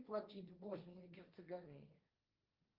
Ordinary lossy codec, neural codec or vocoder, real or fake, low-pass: Opus, 24 kbps; codec, 16 kHz, 1.1 kbps, Voila-Tokenizer; fake; 5.4 kHz